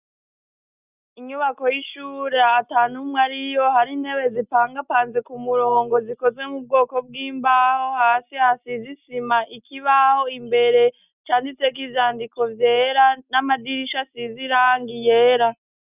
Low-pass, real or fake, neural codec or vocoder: 3.6 kHz; real; none